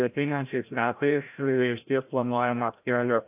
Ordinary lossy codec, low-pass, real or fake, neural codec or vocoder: AAC, 32 kbps; 3.6 kHz; fake; codec, 16 kHz, 0.5 kbps, FreqCodec, larger model